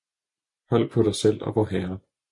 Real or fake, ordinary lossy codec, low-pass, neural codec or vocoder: real; MP3, 48 kbps; 10.8 kHz; none